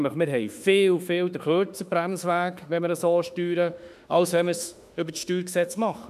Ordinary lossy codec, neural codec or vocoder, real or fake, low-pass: none; autoencoder, 48 kHz, 32 numbers a frame, DAC-VAE, trained on Japanese speech; fake; 14.4 kHz